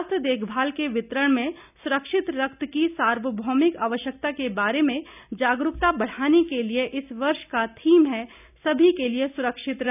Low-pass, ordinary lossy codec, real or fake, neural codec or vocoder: 3.6 kHz; none; real; none